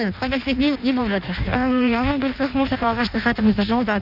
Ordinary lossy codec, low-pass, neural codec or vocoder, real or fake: none; 5.4 kHz; codec, 16 kHz in and 24 kHz out, 0.6 kbps, FireRedTTS-2 codec; fake